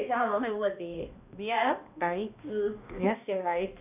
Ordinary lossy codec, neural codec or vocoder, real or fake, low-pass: none; codec, 16 kHz, 1 kbps, X-Codec, HuBERT features, trained on balanced general audio; fake; 3.6 kHz